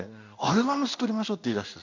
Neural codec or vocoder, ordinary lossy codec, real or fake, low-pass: codec, 16 kHz in and 24 kHz out, 1 kbps, XY-Tokenizer; none; fake; 7.2 kHz